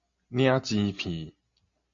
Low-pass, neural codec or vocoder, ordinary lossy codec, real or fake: 7.2 kHz; none; MP3, 48 kbps; real